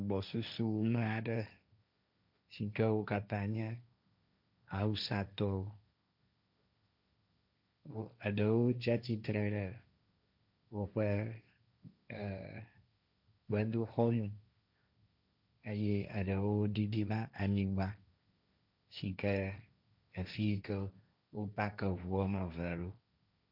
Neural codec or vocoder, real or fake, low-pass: codec, 16 kHz, 1.1 kbps, Voila-Tokenizer; fake; 5.4 kHz